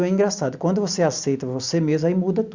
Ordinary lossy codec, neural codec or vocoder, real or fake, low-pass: Opus, 64 kbps; none; real; 7.2 kHz